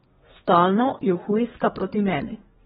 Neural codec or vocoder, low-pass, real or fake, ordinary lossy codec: codec, 32 kHz, 1.9 kbps, SNAC; 14.4 kHz; fake; AAC, 16 kbps